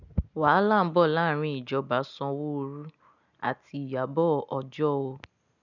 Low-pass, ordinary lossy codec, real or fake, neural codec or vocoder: 7.2 kHz; none; real; none